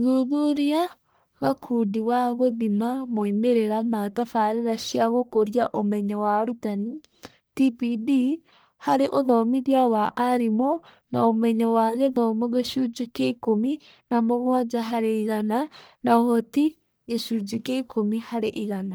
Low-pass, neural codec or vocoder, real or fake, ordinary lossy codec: none; codec, 44.1 kHz, 1.7 kbps, Pupu-Codec; fake; none